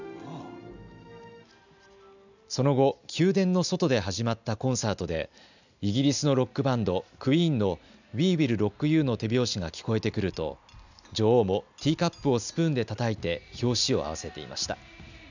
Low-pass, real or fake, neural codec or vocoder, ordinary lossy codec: 7.2 kHz; real; none; none